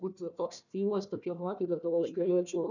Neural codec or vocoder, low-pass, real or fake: codec, 16 kHz, 1 kbps, FunCodec, trained on Chinese and English, 50 frames a second; 7.2 kHz; fake